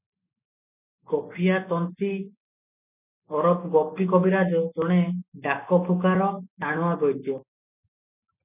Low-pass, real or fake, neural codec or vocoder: 3.6 kHz; real; none